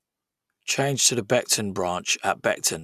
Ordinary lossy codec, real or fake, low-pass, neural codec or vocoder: none; real; 14.4 kHz; none